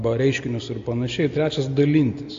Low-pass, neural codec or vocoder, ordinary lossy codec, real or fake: 7.2 kHz; none; AAC, 48 kbps; real